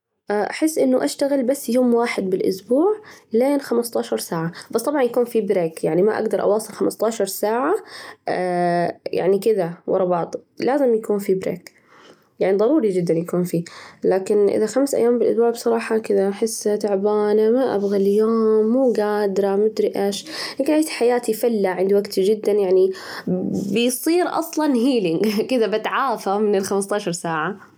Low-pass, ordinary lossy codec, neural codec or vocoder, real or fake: 19.8 kHz; none; none; real